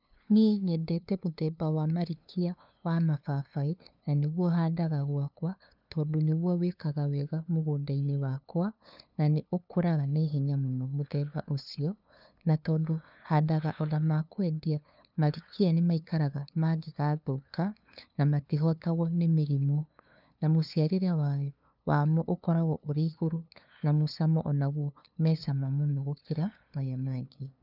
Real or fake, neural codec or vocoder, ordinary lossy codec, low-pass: fake; codec, 16 kHz, 2 kbps, FunCodec, trained on LibriTTS, 25 frames a second; none; 5.4 kHz